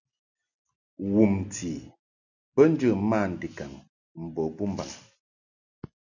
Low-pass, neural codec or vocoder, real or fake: 7.2 kHz; none; real